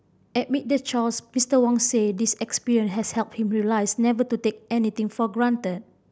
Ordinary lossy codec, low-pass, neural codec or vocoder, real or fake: none; none; none; real